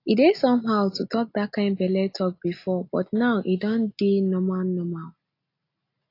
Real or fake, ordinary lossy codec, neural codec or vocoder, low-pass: real; AAC, 32 kbps; none; 5.4 kHz